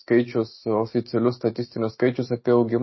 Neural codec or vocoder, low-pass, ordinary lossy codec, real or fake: none; 7.2 kHz; MP3, 24 kbps; real